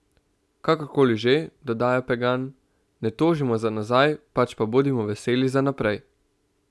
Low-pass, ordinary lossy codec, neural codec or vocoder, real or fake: none; none; none; real